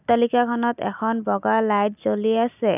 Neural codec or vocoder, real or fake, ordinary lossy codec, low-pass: none; real; none; 3.6 kHz